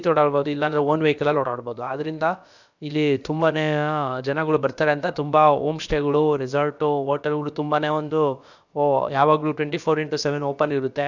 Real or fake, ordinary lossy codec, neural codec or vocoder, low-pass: fake; none; codec, 16 kHz, about 1 kbps, DyCAST, with the encoder's durations; 7.2 kHz